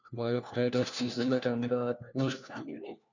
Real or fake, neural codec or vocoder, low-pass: fake; codec, 16 kHz, 1 kbps, FunCodec, trained on LibriTTS, 50 frames a second; 7.2 kHz